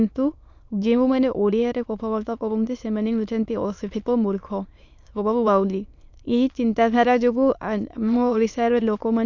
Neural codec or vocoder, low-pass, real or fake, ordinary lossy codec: autoencoder, 22.05 kHz, a latent of 192 numbers a frame, VITS, trained on many speakers; 7.2 kHz; fake; none